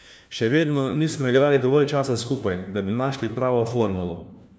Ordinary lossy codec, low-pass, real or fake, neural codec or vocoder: none; none; fake; codec, 16 kHz, 1 kbps, FunCodec, trained on LibriTTS, 50 frames a second